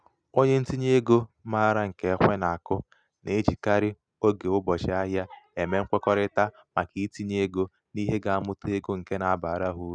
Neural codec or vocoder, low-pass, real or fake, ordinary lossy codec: none; 9.9 kHz; real; none